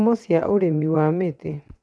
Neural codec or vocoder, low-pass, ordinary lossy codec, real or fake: vocoder, 22.05 kHz, 80 mel bands, WaveNeXt; none; none; fake